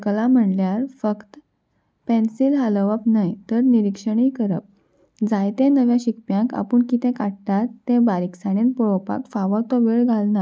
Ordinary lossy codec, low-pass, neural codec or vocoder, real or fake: none; none; none; real